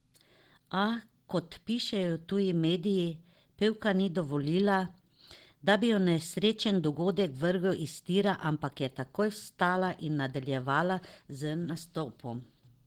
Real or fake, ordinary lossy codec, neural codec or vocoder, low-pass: real; Opus, 16 kbps; none; 19.8 kHz